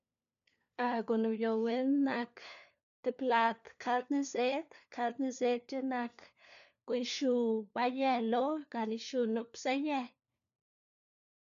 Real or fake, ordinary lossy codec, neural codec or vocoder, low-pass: fake; MP3, 96 kbps; codec, 16 kHz, 4 kbps, FunCodec, trained on LibriTTS, 50 frames a second; 7.2 kHz